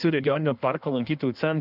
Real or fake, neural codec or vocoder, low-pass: fake; codec, 16 kHz in and 24 kHz out, 1.1 kbps, FireRedTTS-2 codec; 5.4 kHz